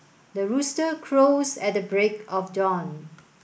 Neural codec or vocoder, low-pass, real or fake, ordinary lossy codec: none; none; real; none